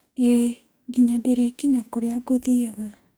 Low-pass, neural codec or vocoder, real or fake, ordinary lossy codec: none; codec, 44.1 kHz, 2.6 kbps, DAC; fake; none